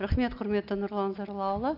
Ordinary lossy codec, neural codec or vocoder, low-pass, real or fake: none; none; 5.4 kHz; real